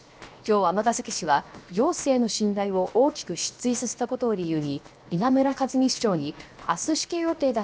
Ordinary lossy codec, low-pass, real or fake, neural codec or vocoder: none; none; fake; codec, 16 kHz, 0.7 kbps, FocalCodec